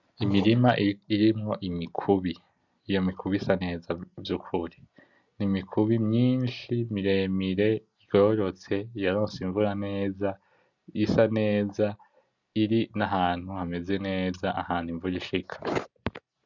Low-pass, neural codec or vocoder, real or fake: 7.2 kHz; none; real